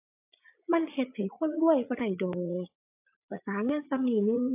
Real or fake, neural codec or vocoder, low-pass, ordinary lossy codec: fake; vocoder, 44.1 kHz, 80 mel bands, Vocos; 3.6 kHz; AAC, 32 kbps